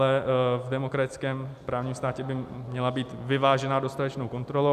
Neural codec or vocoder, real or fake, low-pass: none; real; 14.4 kHz